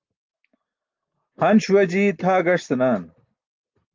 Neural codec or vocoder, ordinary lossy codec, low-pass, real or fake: none; Opus, 32 kbps; 7.2 kHz; real